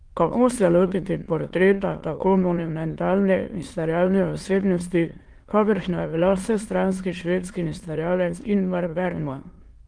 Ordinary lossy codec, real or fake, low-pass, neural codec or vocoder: Opus, 24 kbps; fake; 9.9 kHz; autoencoder, 22.05 kHz, a latent of 192 numbers a frame, VITS, trained on many speakers